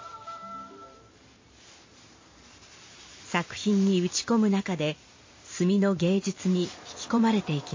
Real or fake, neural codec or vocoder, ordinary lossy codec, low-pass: fake; vocoder, 44.1 kHz, 128 mel bands every 512 samples, BigVGAN v2; MP3, 32 kbps; 7.2 kHz